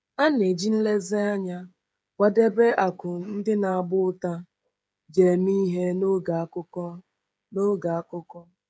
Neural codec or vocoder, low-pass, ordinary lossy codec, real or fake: codec, 16 kHz, 16 kbps, FreqCodec, smaller model; none; none; fake